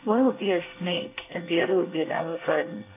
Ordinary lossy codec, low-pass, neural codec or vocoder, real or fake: AAC, 24 kbps; 3.6 kHz; codec, 24 kHz, 1 kbps, SNAC; fake